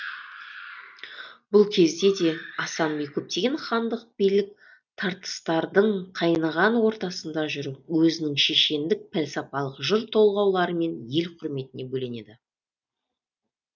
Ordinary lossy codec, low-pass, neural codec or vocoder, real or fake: none; 7.2 kHz; none; real